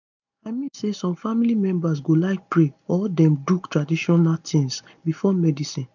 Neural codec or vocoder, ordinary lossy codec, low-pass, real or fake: none; none; 7.2 kHz; real